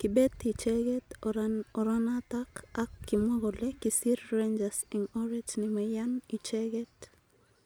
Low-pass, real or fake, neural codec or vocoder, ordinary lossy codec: none; real; none; none